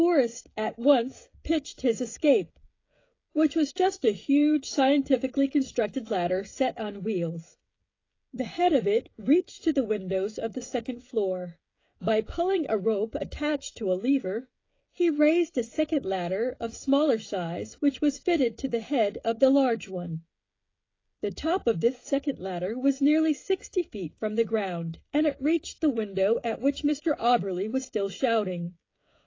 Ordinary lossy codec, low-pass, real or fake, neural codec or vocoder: AAC, 32 kbps; 7.2 kHz; fake; codec, 16 kHz, 16 kbps, FreqCodec, smaller model